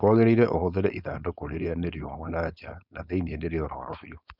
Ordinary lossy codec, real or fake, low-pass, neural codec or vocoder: none; fake; 5.4 kHz; codec, 16 kHz, 4.8 kbps, FACodec